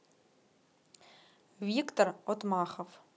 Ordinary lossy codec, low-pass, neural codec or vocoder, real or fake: none; none; none; real